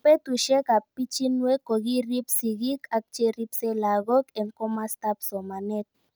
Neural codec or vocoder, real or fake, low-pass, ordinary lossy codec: none; real; none; none